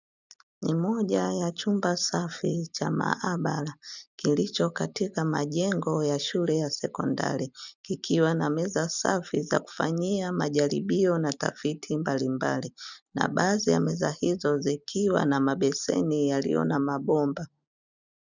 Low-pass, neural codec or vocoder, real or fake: 7.2 kHz; none; real